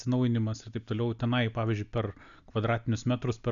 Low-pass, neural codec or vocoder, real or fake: 7.2 kHz; none; real